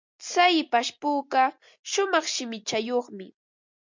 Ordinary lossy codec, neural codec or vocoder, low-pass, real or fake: MP3, 64 kbps; none; 7.2 kHz; real